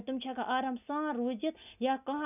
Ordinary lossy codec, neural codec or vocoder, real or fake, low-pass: none; none; real; 3.6 kHz